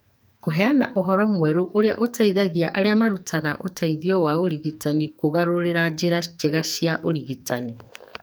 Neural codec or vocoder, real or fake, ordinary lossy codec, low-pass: codec, 44.1 kHz, 2.6 kbps, SNAC; fake; none; none